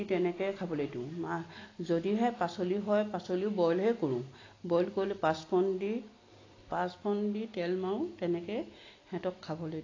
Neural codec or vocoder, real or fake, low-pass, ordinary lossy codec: none; real; 7.2 kHz; AAC, 32 kbps